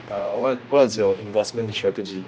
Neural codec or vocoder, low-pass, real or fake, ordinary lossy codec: codec, 16 kHz, 1 kbps, X-Codec, HuBERT features, trained on general audio; none; fake; none